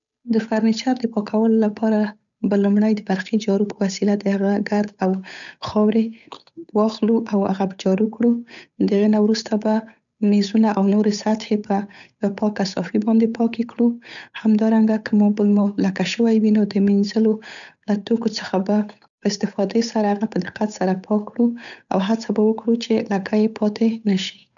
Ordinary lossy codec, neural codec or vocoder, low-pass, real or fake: none; codec, 16 kHz, 8 kbps, FunCodec, trained on Chinese and English, 25 frames a second; 7.2 kHz; fake